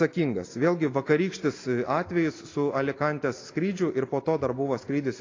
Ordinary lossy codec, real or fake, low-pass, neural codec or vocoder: AAC, 32 kbps; real; 7.2 kHz; none